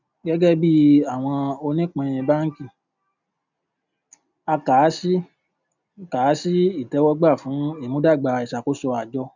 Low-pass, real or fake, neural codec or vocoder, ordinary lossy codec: 7.2 kHz; real; none; none